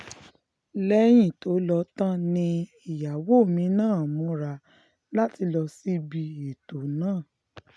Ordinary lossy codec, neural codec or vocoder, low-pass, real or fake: none; none; none; real